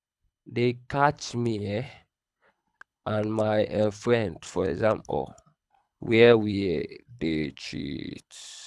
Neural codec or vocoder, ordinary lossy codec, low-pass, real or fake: codec, 24 kHz, 6 kbps, HILCodec; none; none; fake